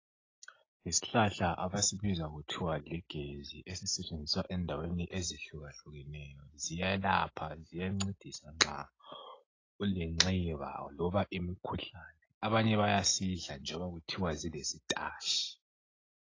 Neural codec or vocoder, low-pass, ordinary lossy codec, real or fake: none; 7.2 kHz; AAC, 32 kbps; real